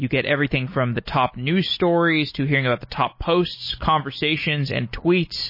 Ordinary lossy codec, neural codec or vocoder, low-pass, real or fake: MP3, 24 kbps; none; 5.4 kHz; real